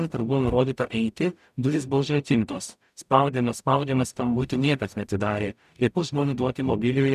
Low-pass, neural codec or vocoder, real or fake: 14.4 kHz; codec, 44.1 kHz, 0.9 kbps, DAC; fake